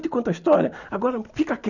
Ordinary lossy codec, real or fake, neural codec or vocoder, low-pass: none; real; none; 7.2 kHz